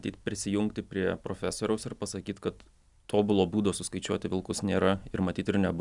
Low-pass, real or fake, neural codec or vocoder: 10.8 kHz; real; none